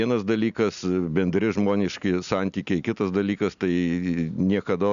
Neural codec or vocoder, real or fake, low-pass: none; real; 7.2 kHz